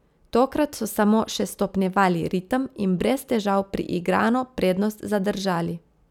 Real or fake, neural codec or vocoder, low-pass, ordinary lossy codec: real; none; 19.8 kHz; none